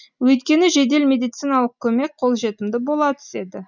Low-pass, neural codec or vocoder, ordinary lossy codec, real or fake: 7.2 kHz; none; none; real